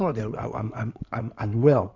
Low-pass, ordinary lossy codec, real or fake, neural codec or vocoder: 7.2 kHz; none; fake; codec, 16 kHz in and 24 kHz out, 2.2 kbps, FireRedTTS-2 codec